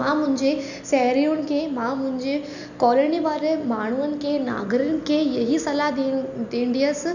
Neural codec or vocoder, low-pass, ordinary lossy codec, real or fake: none; 7.2 kHz; none; real